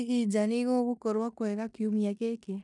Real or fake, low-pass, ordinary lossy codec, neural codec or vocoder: fake; 10.8 kHz; none; codec, 16 kHz in and 24 kHz out, 0.9 kbps, LongCat-Audio-Codec, four codebook decoder